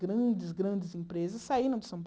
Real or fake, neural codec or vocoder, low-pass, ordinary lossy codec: real; none; none; none